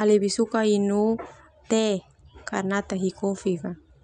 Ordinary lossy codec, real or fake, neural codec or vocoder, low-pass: none; real; none; 9.9 kHz